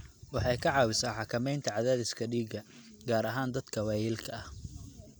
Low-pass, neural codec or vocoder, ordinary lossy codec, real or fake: none; none; none; real